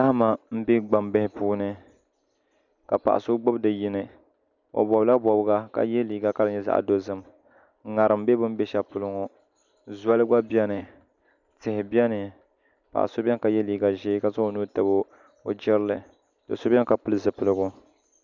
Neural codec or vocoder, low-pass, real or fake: none; 7.2 kHz; real